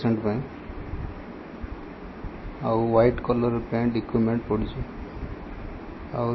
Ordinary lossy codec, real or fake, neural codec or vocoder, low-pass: MP3, 24 kbps; real; none; 7.2 kHz